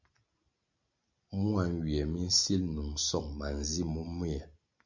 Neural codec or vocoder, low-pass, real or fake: none; 7.2 kHz; real